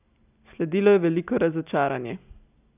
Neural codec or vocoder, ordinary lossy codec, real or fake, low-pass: none; none; real; 3.6 kHz